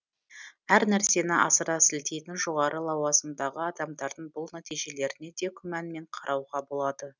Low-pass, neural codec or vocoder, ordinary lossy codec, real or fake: 7.2 kHz; none; none; real